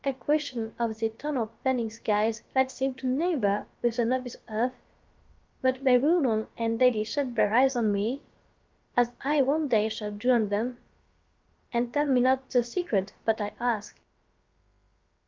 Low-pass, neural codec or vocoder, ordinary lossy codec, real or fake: 7.2 kHz; codec, 16 kHz, about 1 kbps, DyCAST, with the encoder's durations; Opus, 24 kbps; fake